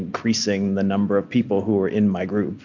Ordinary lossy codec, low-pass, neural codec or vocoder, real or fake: MP3, 64 kbps; 7.2 kHz; none; real